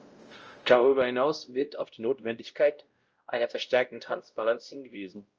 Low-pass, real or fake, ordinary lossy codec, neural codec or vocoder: 7.2 kHz; fake; Opus, 24 kbps; codec, 16 kHz, 0.5 kbps, X-Codec, WavLM features, trained on Multilingual LibriSpeech